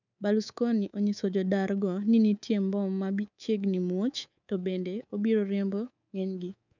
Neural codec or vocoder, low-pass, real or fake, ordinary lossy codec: codec, 24 kHz, 3.1 kbps, DualCodec; 7.2 kHz; fake; none